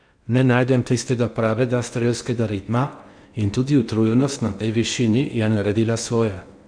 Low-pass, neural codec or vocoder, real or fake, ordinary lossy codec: 9.9 kHz; codec, 16 kHz in and 24 kHz out, 0.8 kbps, FocalCodec, streaming, 65536 codes; fake; none